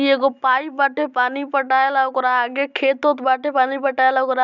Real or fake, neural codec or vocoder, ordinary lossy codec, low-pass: real; none; none; 7.2 kHz